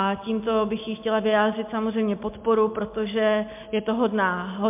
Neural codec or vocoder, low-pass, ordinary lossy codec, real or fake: none; 3.6 kHz; MP3, 32 kbps; real